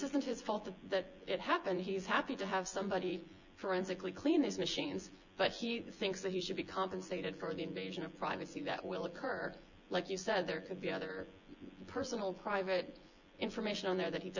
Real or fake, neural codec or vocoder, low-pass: fake; vocoder, 24 kHz, 100 mel bands, Vocos; 7.2 kHz